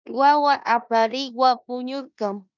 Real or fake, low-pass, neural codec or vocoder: fake; 7.2 kHz; codec, 16 kHz in and 24 kHz out, 0.9 kbps, LongCat-Audio-Codec, four codebook decoder